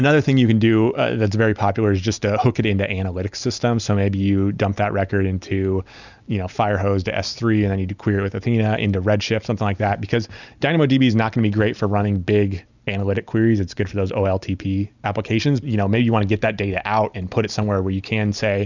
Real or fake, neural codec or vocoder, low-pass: real; none; 7.2 kHz